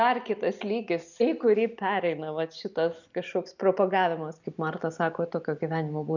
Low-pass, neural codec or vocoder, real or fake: 7.2 kHz; none; real